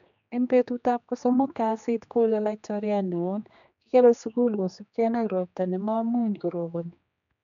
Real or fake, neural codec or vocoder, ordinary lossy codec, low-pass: fake; codec, 16 kHz, 2 kbps, X-Codec, HuBERT features, trained on general audio; none; 7.2 kHz